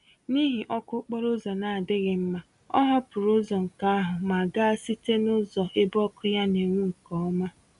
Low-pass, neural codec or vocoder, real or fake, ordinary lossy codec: 10.8 kHz; none; real; none